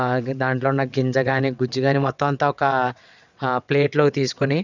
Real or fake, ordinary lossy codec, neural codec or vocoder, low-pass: fake; none; vocoder, 22.05 kHz, 80 mel bands, WaveNeXt; 7.2 kHz